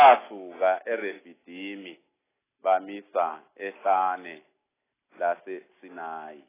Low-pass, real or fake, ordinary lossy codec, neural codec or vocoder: 3.6 kHz; real; AAC, 16 kbps; none